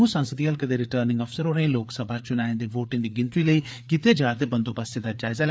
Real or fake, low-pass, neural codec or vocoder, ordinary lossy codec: fake; none; codec, 16 kHz, 4 kbps, FreqCodec, larger model; none